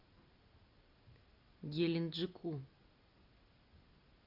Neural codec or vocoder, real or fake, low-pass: none; real; 5.4 kHz